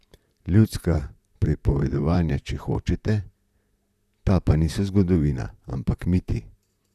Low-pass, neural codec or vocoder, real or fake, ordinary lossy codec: 14.4 kHz; vocoder, 44.1 kHz, 128 mel bands, Pupu-Vocoder; fake; none